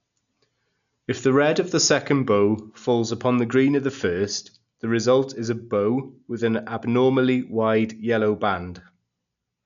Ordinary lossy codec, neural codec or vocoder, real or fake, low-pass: none; none; real; 7.2 kHz